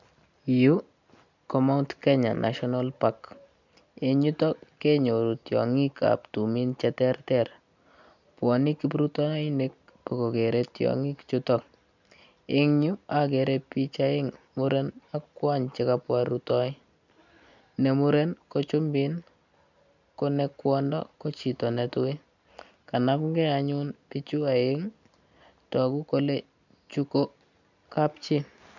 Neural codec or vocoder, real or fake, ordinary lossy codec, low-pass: none; real; none; 7.2 kHz